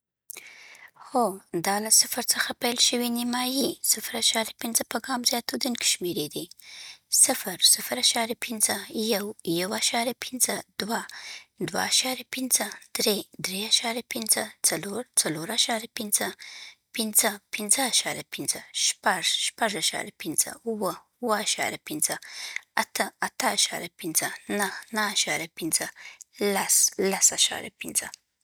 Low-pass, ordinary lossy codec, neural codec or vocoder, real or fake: none; none; none; real